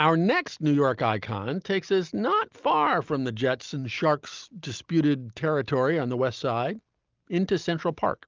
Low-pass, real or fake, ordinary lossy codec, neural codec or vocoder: 7.2 kHz; real; Opus, 32 kbps; none